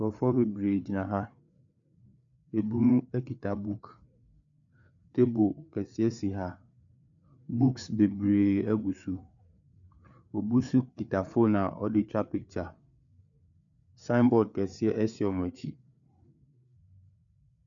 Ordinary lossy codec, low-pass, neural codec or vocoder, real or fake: MP3, 96 kbps; 7.2 kHz; codec, 16 kHz, 4 kbps, FreqCodec, larger model; fake